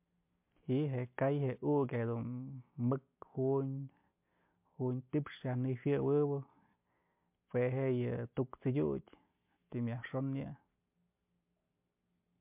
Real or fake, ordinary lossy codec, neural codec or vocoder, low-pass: real; MP3, 32 kbps; none; 3.6 kHz